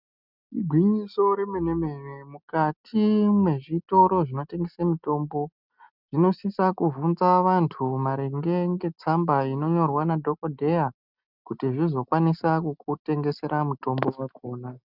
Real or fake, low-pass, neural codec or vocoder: real; 5.4 kHz; none